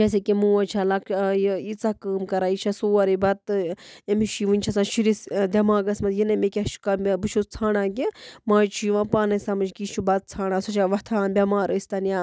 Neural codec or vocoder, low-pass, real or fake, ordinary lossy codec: none; none; real; none